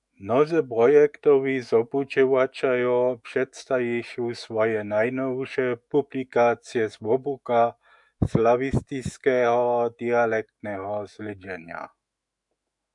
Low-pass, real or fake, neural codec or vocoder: 10.8 kHz; fake; autoencoder, 48 kHz, 128 numbers a frame, DAC-VAE, trained on Japanese speech